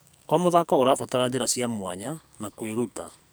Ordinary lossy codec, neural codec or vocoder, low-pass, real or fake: none; codec, 44.1 kHz, 2.6 kbps, SNAC; none; fake